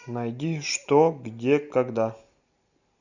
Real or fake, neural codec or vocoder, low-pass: real; none; 7.2 kHz